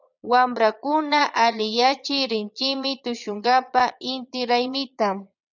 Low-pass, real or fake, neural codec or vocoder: 7.2 kHz; fake; vocoder, 22.05 kHz, 80 mel bands, Vocos